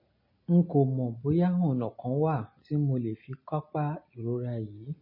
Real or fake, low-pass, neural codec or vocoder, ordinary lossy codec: real; 5.4 kHz; none; MP3, 24 kbps